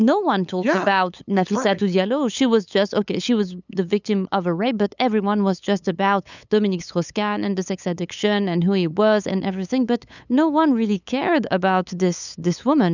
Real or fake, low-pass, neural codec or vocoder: fake; 7.2 kHz; codec, 16 kHz, 8 kbps, FunCodec, trained on LibriTTS, 25 frames a second